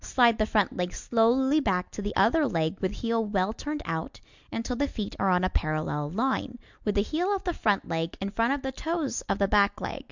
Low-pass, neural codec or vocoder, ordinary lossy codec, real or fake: 7.2 kHz; none; Opus, 64 kbps; real